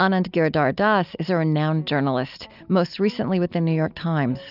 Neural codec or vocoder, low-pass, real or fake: none; 5.4 kHz; real